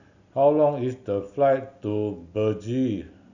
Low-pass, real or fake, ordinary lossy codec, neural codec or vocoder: 7.2 kHz; real; none; none